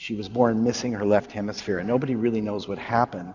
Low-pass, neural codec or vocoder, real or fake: 7.2 kHz; vocoder, 44.1 kHz, 128 mel bands every 512 samples, BigVGAN v2; fake